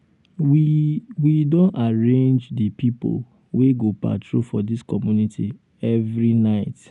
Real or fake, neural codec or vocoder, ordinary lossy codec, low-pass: real; none; none; 10.8 kHz